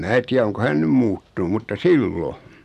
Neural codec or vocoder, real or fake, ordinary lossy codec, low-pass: none; real; none; 14.4 kHz